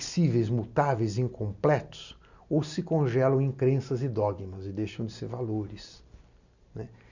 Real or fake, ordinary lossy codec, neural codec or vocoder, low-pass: real; none; none; 7.2 kHz